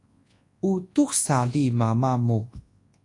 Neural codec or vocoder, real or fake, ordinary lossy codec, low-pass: codec, 24 kHz, 0.9 kbps, WavTokenizer, large speech release; fake; AAC, 48 kbps; 10.8 kHz